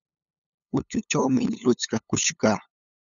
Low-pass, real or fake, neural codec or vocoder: 7.2 kHz; fake; codec, 16 kHz, 8 kbps, FunCodec, trained on LibriTTS, 25 frames a second